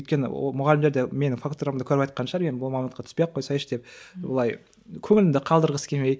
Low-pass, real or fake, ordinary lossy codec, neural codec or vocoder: none; real; none; none